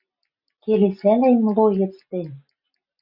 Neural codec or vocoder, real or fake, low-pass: none; real; 5.4 kHz